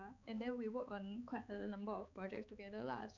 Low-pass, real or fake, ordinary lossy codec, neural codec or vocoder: 7.2 kHz; fake; none; codec, 16 kHz, 4 kbps, X-Codec, HuBERT features, trained on balanced general audio